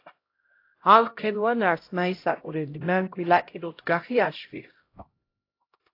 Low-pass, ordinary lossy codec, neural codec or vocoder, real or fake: 5.4 kHz; AAC, 32 kbps; codec, 16 kHz, 0.5 kbps, X-Codec, HuBERT features, trained on LibriSpeech; fake